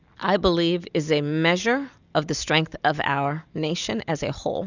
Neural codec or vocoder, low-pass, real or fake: none; 7.2 kHz; real